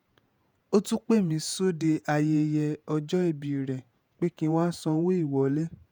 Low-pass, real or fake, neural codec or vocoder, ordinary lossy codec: none; fake; vocoder, 48 kHz, 128 mel bands, Vocos; none